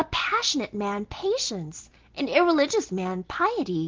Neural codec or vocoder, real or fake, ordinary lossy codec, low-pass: none; real; Opus, 16 kbps; 7.2 kHz